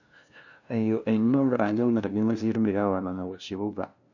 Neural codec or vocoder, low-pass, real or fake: codec, 16 kHz, 0.5 kbps, FunCodec, trained on LibriTTS, 25 frames a second; 7.2 kHz; fake